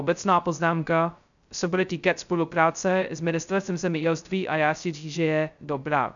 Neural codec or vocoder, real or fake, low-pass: codec, 16 kHz, 0.2 kbps, FocalCodec; fake; 7.2 kHz